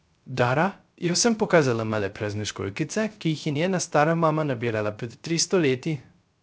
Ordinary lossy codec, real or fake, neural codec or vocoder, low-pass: none; fake; codec, 16 kHz, 0.3 kbps, FocalCodec; none